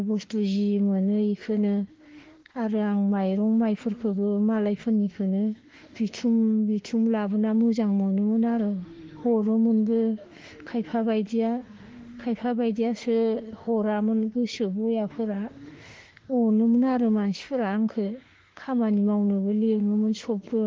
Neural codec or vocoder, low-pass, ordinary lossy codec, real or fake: autoencoder, 48 kHz, 32 numbers a frame, DAC-VAE, trained on Japanese speech; 7.2 kHz; Opus, 16 kbps; fake